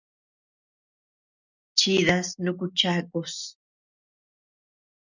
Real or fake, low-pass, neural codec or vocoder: real; 7.2 kHz; none